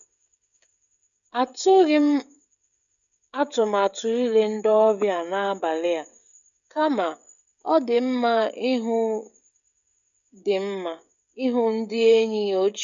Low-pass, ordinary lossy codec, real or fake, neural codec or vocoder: 7.2 kHz; none; fake; codec, 16 kHz, 16 kbps, FreqCodec, smaller model